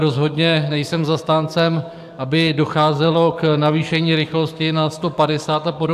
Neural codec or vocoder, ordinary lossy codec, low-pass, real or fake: codec, 44.1 kHz, 7.8 kbps, DAC; AAC, 96 kbps; 14.4 kHz; fake